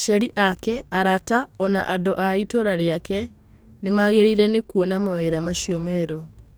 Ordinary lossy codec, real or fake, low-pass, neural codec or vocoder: none; fake; none; codec, 44.1 kHz, 2.6 kbps, DAC